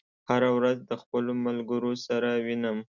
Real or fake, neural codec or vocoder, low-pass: real; none; 7.2 kHz